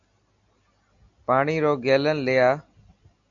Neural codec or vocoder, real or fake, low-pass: none; real; 7.2 kHz